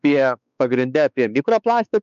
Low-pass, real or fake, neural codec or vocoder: 7.2 kHz; fake; codec, 16 kHz, 4 kbps, X-Codec, WavLM features, trained on Multilingual LibriSpeech